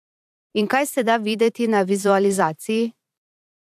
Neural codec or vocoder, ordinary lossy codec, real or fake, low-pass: vocoder, 44.1 kHz, 128 mel bands every 512 samples, BigVGAN v2; AAC, 96 kbps; fake; 14.4 kHz